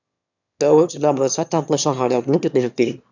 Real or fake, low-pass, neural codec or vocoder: fake; 7.2 kHz; autoencoder, 22.05 kHz, a latent of 192 numbers a frame, VITS, trained on one speaker